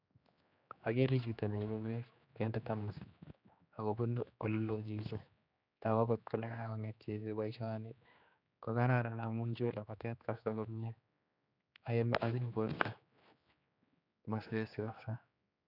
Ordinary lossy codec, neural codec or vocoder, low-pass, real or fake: none; codec, 16 kHz, 2 kbps, X-Codec, HuBERT features, trained on general audio; 5.4 kHz; fake